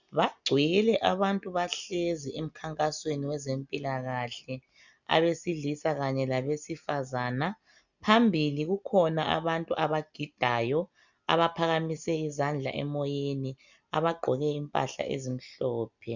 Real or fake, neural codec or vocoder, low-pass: real; none; 7.2 kHz